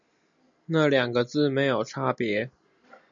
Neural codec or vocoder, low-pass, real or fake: none; 7.2 kHz; real